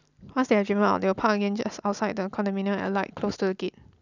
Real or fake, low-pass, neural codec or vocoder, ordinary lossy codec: real; 7.2 kHz; none; none